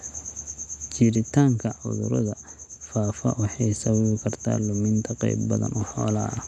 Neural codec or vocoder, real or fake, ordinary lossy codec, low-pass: none; real; none; none